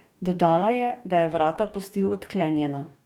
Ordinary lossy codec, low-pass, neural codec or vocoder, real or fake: none; 19.8 kHz; codec, 44.1 kHz, 2.6 kbps, DAC; fake